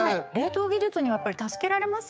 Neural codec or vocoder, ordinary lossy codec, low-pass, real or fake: codec, 16 kHz, 4 kbps, X-Codec, HuBERT features, trained on general audio; none; none; fake